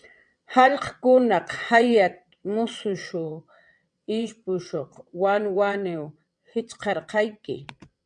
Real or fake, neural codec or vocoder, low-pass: fake; vocoder, 22.05 kHz, 80 mel bands, WaveNeXt; 9.9 kHz